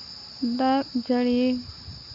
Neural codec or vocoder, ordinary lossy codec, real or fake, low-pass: none; Opus, 64 kbps; real; 5.4 kHz